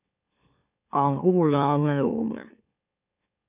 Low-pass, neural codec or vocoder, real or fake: 3.6 kHz; autoencoder, 44.1 kHz, a latent of 192 numbers a frame, MeloTTS; fake